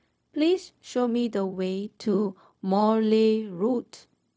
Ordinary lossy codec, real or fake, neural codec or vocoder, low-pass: none; fake; codec, 16 kHz, 0.4 kbps, LongCat-Audio-Codec; none